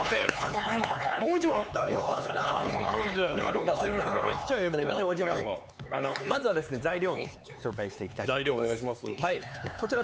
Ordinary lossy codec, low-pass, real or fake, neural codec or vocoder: none; none; fake; codec, 16 kHz, 4 kbps, X-Codec, HuBERT features, trained on LibriSpeech